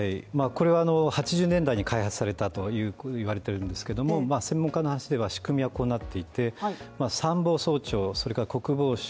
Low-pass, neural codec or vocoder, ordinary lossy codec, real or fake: none; none; none; real